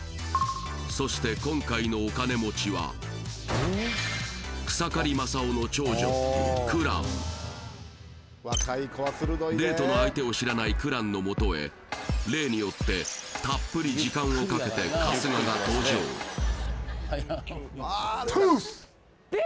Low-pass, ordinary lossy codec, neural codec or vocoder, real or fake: none; none; none; real